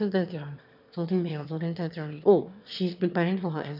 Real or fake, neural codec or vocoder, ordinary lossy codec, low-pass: fake; autoencoder, 22.05 kHz, a latent of 192 numbers a frame, VITS, trained on one speaker; none; 5.4 kHz